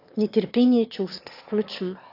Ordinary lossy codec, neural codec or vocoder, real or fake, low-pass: AAC, 32 kbps; autoencoder, 22.05 kHz, a latent of 192 numbers a frame, VITS, trained on one speaker; fake; 5.4 kHz